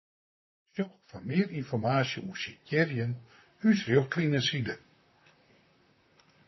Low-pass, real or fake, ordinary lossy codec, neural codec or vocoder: 7.2 kHz; fake; MP3, 24 kbps; vocoder, 44.1 kHz, 128 mel bands, Pupu-Vocoder